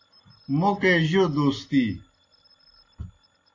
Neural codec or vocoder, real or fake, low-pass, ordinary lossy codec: none; real; 7.2 kHz; AAC, 32 kbps